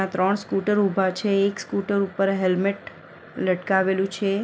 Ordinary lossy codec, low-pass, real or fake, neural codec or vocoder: none; none; real; none